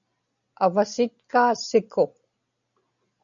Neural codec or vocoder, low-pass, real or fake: none; 7.2 kHz; real